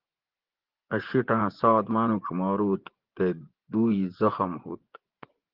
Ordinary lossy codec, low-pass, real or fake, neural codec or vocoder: Opus, 16 kbps; 5.4 kHz; fake; vocoder, 24 kHz, 100 mel bands, Vocos